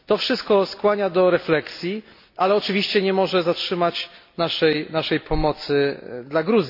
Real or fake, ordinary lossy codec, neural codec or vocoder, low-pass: real; MP3, 32 kbps; none; 5.4 kHz